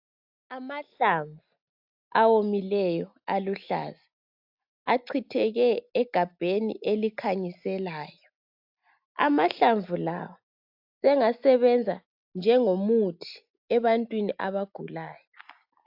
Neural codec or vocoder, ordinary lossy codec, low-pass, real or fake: none; AAC, 48 kbps; 5.4 kHz; real